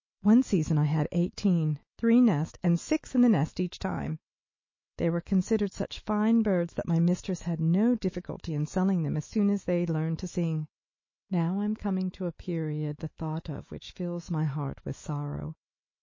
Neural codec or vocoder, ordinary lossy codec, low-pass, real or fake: autoencoder, 48 kHz, 128 numbers a frame, DAC-VAE, trained on Japanese speech; MP3, 32 kbps; 7.2 kHz; fake